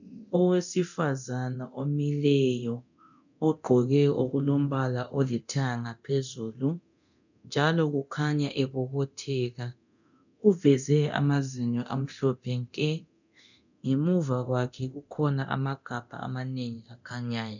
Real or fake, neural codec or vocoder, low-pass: fake; codec, 24 kHz, 0.9 kbps, DualCodec; 7.2 kHz